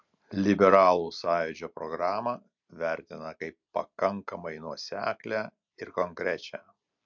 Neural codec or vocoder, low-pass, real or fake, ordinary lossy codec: none; 7.2 kHz; real; MP3, 64 kbps